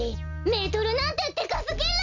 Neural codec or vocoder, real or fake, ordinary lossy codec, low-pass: none; real; none; 7.2 kHz